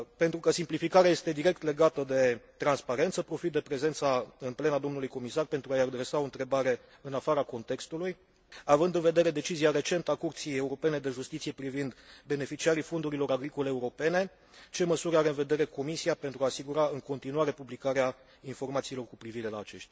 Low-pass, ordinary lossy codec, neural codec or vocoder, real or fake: none; none; none; real